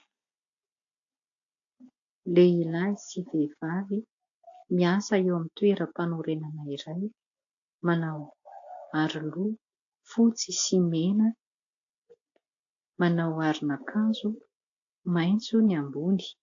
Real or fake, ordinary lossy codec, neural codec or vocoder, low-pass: real; AAC, 48 kbps; none; 7.2 kHz